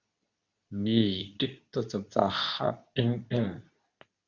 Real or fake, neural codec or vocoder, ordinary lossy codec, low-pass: fake; codec, 24 kHz, 0.9 kbps, WavTokenizer, medium speech release version 2; Opus, 64 kbps; 7.2 kHz